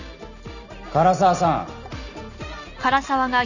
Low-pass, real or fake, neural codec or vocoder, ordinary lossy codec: 7.2 kHz; real; none; none